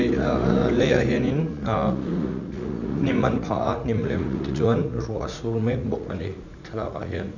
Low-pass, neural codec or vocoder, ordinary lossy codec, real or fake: 7.2 kHz; vocoder, 44.1 kHz, 80 mel bands, Vocos; none; fake